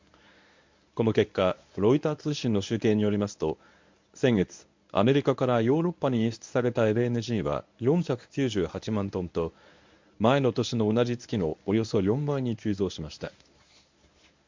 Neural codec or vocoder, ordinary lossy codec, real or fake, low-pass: codec, 24 kHz, 0.9 kbps, WavTokenizer, medium speech release version 1; MP3, 64 kbps; fake; 7.2 kHz